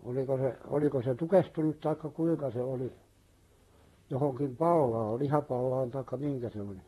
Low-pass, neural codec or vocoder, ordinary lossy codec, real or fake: 19.8 kHz; vocoder, 44.1 kHz, 128 mel bands, Pupu-Vocoder; AAC, 32 kbps; fake